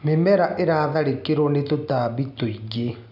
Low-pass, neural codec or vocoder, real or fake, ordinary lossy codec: 5.4 kHz; none; real; none